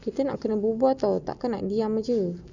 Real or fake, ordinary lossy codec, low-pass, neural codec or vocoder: real; none; 7.2 kHz; none